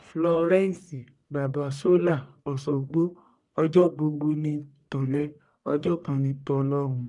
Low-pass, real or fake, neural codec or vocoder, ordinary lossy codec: 10.8 kHz; fake; codec, 44.1 kHz, 1.7 kbps, Pupu-Codec; none